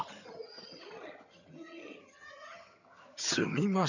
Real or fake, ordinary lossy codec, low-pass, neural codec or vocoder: fake; none; 7.2 kHz; vocoder, 22.05 kHz, 80 mel bands, HiFi-GAN